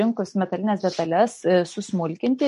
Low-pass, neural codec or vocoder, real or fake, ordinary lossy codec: 14.4 kHz; none; real; MP3, 48 kbps